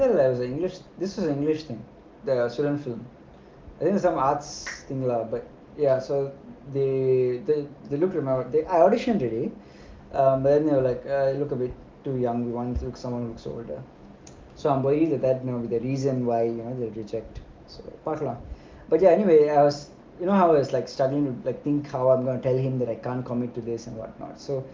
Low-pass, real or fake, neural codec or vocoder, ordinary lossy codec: 7.2 kHz; real; none; Opus, 32 kbps